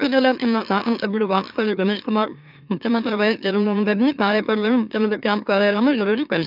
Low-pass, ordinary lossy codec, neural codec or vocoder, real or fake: 5.4 kHz; none; autoencoder, 44.1 kHz, a latent of 192 numbers a frame, MeloTTS; fake